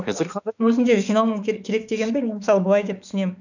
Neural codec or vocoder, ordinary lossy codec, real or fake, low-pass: autoencoder, 48 kHz, 32 numbers a frame, DAC-VAE, trained on Japanese speech; none; fake; 7.2 kHz